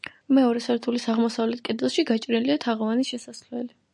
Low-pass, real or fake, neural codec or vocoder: 10.8 kHz; real; none